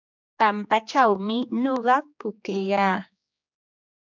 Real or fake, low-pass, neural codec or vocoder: fake; 7.2 kHz; codec, 16 kHz, 2 kbps, X-Codec, HuBERT features, trained on general audio